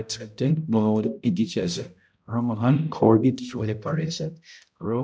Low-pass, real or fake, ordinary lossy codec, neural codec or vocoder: none; fake; none; codec, 16 kHz, 0.5 kbps, X-Codec, HuBERT features, trained on balanced general audio